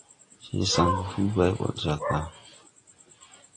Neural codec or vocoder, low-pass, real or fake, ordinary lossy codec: none; 9.9 kHz; real; AAC, 32 kbps